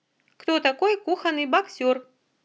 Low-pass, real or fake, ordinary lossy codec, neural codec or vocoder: none; real; none; none